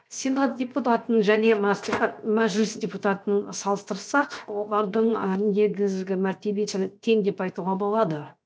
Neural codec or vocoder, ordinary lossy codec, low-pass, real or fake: codec, 16 kHz, 0.7 kbps, FocalCodec; none; none; fake